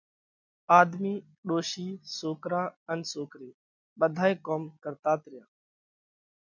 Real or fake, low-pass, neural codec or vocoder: real; 7.2 kHz; none